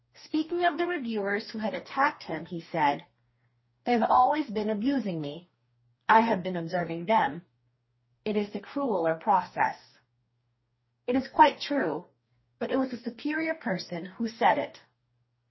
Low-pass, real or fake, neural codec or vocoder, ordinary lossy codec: 7.2 kHz; fake; codec, 44.1 kHz, 2.6 kbps, DAC; MP3, 24 kbps